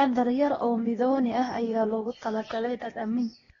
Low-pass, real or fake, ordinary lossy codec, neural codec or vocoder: 7.2 kHz; fake; AAC, 24 kbps; codec, 16 kHz, 0.8 kbps, ZipCodec